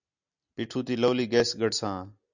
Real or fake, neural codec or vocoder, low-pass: real; none; 7.2 kHz